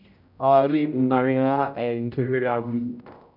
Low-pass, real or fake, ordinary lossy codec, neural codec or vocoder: 5.4 kHz; fake; none; codec, 16 kHz, 0.5 kbps, X-Codec, HuBERT features, trained on general audio